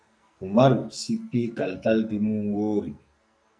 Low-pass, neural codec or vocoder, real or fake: 9.9 kHz; codec, 44.1 kHz, 2.6 kbps, SNAC; fake